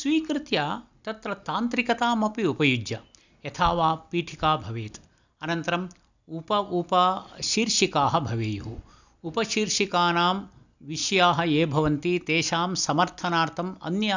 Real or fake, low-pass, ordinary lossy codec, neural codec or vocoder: real; 7.2 kHz; none; none